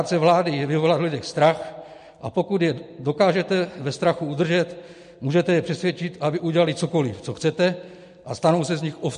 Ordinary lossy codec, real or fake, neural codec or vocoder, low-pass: MP3, 48 kbps; real; none; 10.8 kHz